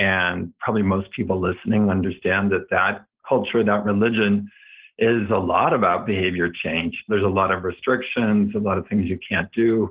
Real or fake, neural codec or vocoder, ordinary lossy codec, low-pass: real; none; Opus, 16 kbps; 3.6 kHz